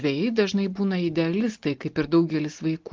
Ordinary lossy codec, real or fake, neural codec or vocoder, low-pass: Opus, 16 kbps; real; none; 7.2 kHz